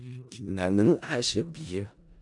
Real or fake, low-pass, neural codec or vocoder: fake; 10.8 kHz; codec, 16 kHz in and 24 kHz out, 0.4 kbps, LongCat-Audio-Codec, four codebook decoder